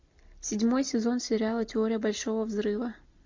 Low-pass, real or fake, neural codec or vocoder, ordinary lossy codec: 7.2 kHz; real; none; MP3, 48 kbps